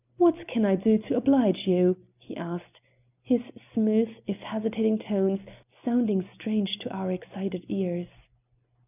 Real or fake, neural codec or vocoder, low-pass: real; none; 3.6 kHz